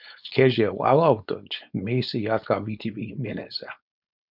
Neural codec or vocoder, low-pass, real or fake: codec, 16 kHz, 4.8 kbps, FACodec; 5.4 kHz; fake